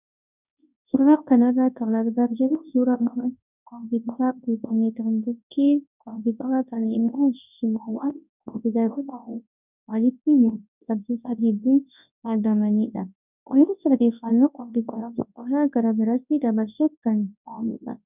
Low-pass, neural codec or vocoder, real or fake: 3.6 kHz; codec, 24 kHz, 0.9 kbps, WavTokenizer, large speech release; fake